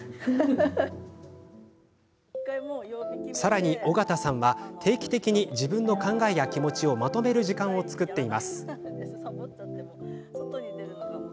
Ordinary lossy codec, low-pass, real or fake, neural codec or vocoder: none; none; real; none